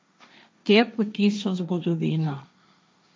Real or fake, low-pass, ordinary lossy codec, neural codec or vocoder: fake; none; none; codec, 16 kHz, 1.1 kbps, Voila-Tokenizer